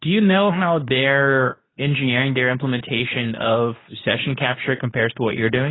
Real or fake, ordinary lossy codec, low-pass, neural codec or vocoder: fake; AAC, 16 kbps; 7.2 kHz; codec, 24 kHz, 0.9 kbps, WavTokenizer, medium speech release version 2